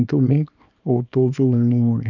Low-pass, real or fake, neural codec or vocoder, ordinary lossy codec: 7.2 kHz; fake; codec, 24 kHz, 0.9 kbps, WavTokenizer, small release; none